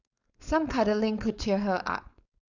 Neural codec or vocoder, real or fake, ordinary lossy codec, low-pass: codec, 16 kHz, 4.8 kbps, FACodec; fake; none; 7.2 kHz